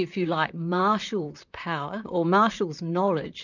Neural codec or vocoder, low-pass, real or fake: vocoder, 44.1 kHz, 128 mel bands, Pupu-Vocoder; 7.2 kHz; fake